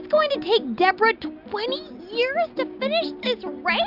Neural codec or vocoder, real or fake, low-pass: none; real; 5.4 kHz